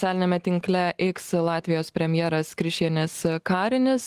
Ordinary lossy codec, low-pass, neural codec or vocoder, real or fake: Opus, 24 kbps; 14.4 kHz; none; real